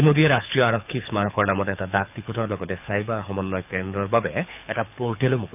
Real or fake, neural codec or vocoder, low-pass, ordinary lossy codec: fake; codec, 24 kHz, 6 kbps, HILCodec; 3.6 kHz; none